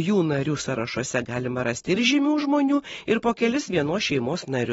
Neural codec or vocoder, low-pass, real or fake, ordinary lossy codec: none; 19.8 kHz; real; AAC, 24 kbps